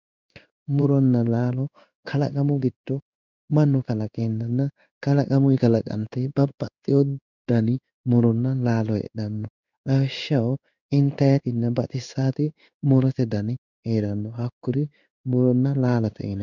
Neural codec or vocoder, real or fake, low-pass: codec, 16 kHz in and 24 kHz out, 1 kbps, XY-Tokenizer; fake; 7.2 kHz